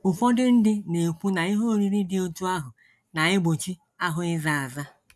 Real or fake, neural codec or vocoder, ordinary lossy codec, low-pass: real; none; none; none